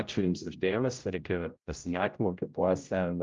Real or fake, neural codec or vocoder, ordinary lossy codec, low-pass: fake; codec, 16 kHz, 0.5 kbps, X-Codec, HuBERT features, trained on general audio; Opus, 32 kbps; 7.2 kHz